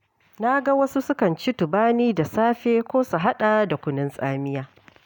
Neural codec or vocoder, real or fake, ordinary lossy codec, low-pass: none; real; none; 19.8 kHz